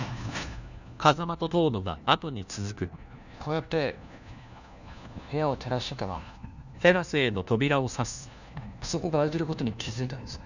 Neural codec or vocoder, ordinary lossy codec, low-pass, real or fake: codec, 16 kHz, 1 kbps, FunCodec, trained on LibriTTS, 50 frames a second; none; 7.2 kHz; fake